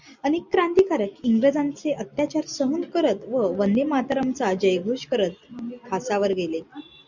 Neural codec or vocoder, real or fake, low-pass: none; real; 7.2 kHz